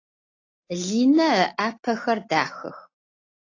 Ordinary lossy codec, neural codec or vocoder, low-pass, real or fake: AAC, 32 kbps; none; 7.2 kHz; real